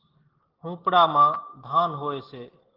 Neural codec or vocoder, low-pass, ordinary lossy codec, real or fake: none; 5.4 kHz; Opus, 16 kbps; real